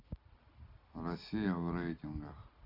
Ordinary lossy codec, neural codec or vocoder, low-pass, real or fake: none; none; 5.4 kHz; real